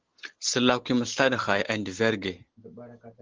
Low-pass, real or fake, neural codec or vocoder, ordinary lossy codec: 7.2 kHz; real; none; Opus, 16 kbps